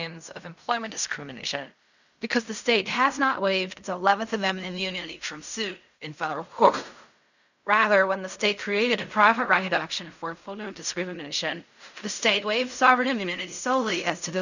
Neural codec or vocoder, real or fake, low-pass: codec, 16 kHz in and 24 kHz out, 0.4 kbps, LongCat-Audio-Codec, fine tuned four codebook decoder; fake; 7.2 kHz